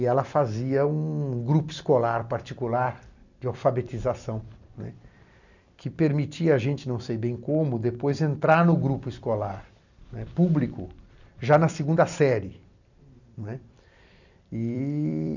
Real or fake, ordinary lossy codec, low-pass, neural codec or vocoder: fake; none; 7.2 kHz; vocoder, 44.1 kHz, 128 mel bands every 256 samples, BigVGAN v2